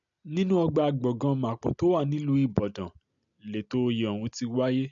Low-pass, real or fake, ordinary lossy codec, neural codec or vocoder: 7.2 kHz; real; none; none